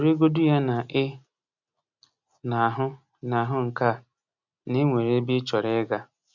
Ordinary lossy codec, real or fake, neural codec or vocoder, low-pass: none; real; none; 7.2 kHz